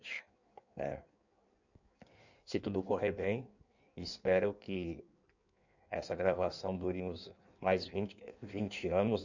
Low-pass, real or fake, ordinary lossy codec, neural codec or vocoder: 7.2 kHz; fake; none; codec, 16 kHz in and 24 kHz out, 1.1 kbps, FireRedTTS-2 codec